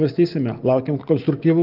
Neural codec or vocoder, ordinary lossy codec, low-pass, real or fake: none; Opus, 32 kbps; 5.4 kHz; real